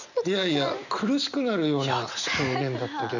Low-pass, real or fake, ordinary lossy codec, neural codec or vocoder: 7.2 kHz; real; none; none